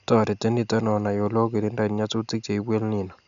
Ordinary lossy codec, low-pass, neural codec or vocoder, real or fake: none; 7.2 kHz; none; real